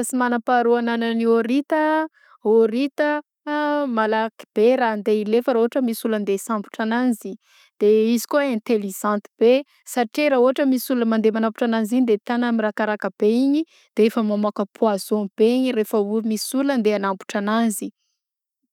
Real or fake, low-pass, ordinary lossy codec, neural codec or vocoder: real; 19.8 kHz; none; none